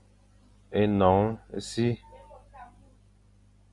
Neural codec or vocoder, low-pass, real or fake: none; 10.8 kHz; real